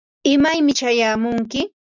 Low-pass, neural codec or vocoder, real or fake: 7.2 kHz; none; real